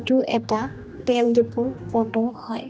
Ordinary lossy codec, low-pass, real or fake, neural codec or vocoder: none; none; fake; codec, 16 kHz, 1 kbps, X-Codec, HuBERT features, trained on general audio